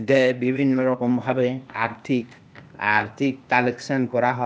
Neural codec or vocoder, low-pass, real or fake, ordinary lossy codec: codec, 16 kHz, 0.8 kbps, ZipCodec; none; fake; none